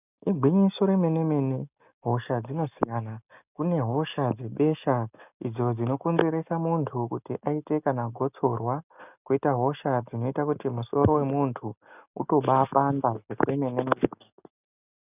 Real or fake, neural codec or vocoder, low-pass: real; none; 3.6 kHz